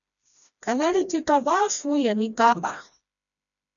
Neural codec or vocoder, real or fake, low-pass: codec, 16 kHz, 1 kbps, FreqCodec, smaller model; fake; 7.2 kHz